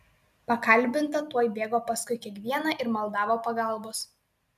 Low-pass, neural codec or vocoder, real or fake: 14.4 kHz; none; real